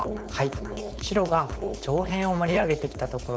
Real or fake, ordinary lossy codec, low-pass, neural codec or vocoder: fake; none; none; codec, 16 kHz, 4.8 kbps, FACodec